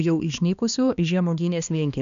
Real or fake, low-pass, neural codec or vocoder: fake; 7.2 kHz; codec, 16 kHz, 2 kbps, X-Codec, HuBERT features, trained on balanced general audio